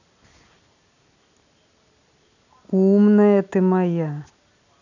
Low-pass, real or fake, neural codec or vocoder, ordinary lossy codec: 7.2 kHz; real; none; none